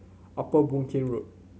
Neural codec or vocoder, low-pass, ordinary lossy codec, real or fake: none; none; none; real